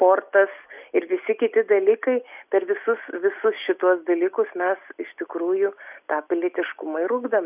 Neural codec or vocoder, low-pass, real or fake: none; 3.6 kHz; real